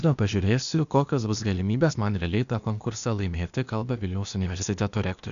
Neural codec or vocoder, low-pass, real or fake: codec, 16 kHz, 0.8 kbps, ZipCodec; 7.2 kHz; fake